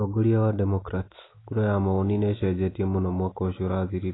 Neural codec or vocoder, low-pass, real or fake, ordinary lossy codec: none; 7.2 kHz; real; AAC, 16 kbps